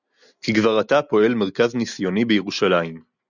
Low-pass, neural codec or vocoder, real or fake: 7.2 kHz; none; real